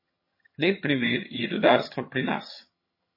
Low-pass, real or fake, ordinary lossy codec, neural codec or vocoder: 5.4 kHz; fake; MP3, 24 kbps; vocoder, 22.05 kHz, 80 mel bands, HiFi-GAN